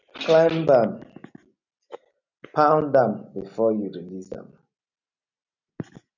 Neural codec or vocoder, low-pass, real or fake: none; 7.2 kHz; real